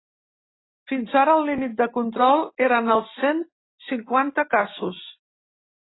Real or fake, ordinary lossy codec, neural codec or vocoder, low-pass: real; AAC, 16 kbps; none; 7.2 kHz